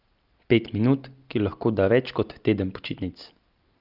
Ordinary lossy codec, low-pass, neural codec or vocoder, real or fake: Opus, 24 kbps; 5.4 kHz; none; real